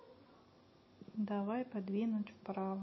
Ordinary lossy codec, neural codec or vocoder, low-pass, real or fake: MP3, 24 kbps; none; 7.2 kHz; real